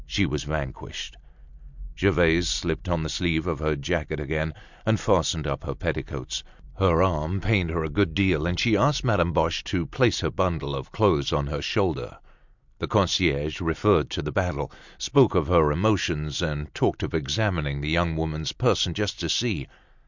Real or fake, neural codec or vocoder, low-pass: real; none; 7.2 kHz